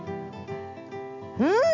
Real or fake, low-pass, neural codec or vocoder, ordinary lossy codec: real; 7.2 kHz; none; none